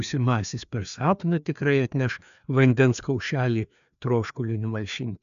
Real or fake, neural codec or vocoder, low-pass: fake; codec, 16 kHz, 2 kbps, FreqCodec, larger model; 7.2 kHz